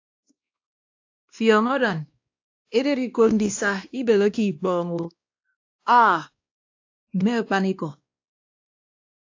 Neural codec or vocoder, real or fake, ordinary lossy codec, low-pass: codec, 16 kHz, 1 kbps, X-Codec, WavLM features, trained on Multilingual LibriSpeech; fake; AAC, 48 kbps; 7.2 kHz